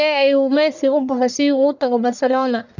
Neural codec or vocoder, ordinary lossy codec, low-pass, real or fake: codec, 44.1 kHz, 1.7 kbps, Pupu-Codec; none; 7.2 kHz; fake